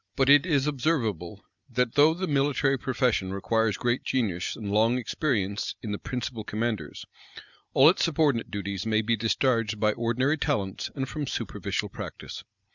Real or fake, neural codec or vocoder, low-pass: real; none; 7.2 kHz